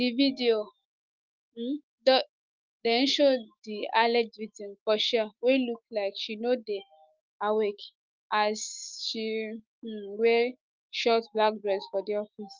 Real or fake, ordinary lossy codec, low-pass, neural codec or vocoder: fake; Opus, 32 kbps; 7.2 kHz; autoencoder, 48 kHz, 128 numbers a frame, DAC-VAE, trained on Japanese speech